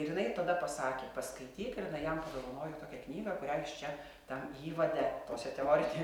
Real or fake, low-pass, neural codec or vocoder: real; 19.8 kHz; none